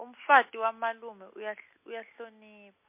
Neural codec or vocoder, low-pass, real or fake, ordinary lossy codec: none; 3.6 kHz; real; MP3, 24 kbps